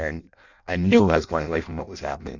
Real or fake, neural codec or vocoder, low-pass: fake; codec, 16 kHz in and 24 kHz out, 0.6 kbps, FireRedTTS-2 codec; 7.2 kHz